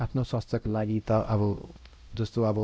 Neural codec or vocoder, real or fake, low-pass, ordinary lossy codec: codec, 16 kHz, 0.5 kbps, X-Codec, WavLM features, trained on Multilingual LibriSpeech; fake; none; none